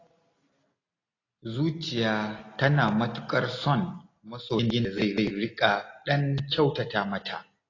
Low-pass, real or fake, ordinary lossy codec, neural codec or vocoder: 7.2 kHz; real; AAC, 32 kbps; none